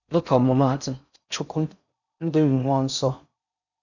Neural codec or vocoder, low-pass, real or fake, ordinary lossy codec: codec, 16 kHz in and 24 kHz out, 0.6 kbps, FocalCodec, streaming, 4096 codes; 7.2 kHz; fake; none